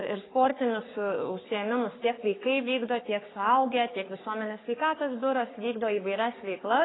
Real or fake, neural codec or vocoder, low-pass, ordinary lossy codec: fake; codec, 44.1 kHz, 3.4 kbps, Pupu-Codec; 7.2 kHz; AAC, 16 kbps